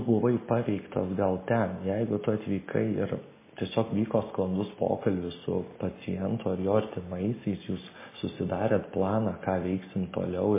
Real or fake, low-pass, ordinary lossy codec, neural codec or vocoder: real; 3.6 kHz; MP3, 16 kbps; none